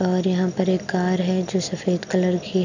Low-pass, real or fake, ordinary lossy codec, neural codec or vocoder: 7.2 kHz; real; none; none